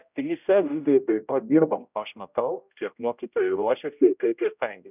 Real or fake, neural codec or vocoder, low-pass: fake; codec, 16 kHz, 0.5 kbps, X-Codec, HuBERT features, trained on general audio; 3.6 kHz